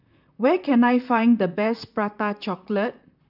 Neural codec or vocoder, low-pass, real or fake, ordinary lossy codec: vocoder, 44.1 kHz, 128 mel bands, Pupu-Vocoder; 5.4 kHz; fake; none